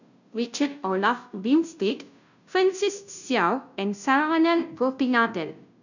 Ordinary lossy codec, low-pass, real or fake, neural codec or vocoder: none; 7.2 kHz; fake; codec, 16 kHz, 0.5 kbps, FunCodec, trained on Chinese and English, 25 frames a second